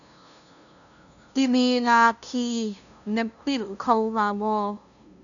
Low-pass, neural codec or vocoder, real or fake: 7.2 kHz; codec, 16 kHz, 0.5 kbps, FunCodec, trained on LibriTTS, 25 frames a second; fake